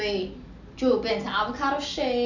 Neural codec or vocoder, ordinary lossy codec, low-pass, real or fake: none; none; 7.2 kHz; real